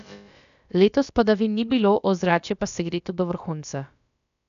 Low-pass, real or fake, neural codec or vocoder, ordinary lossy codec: 7.2 kHz; fake; codec, 16 kHz, about 1 kbps, DyCAST, with the encoder's durations; none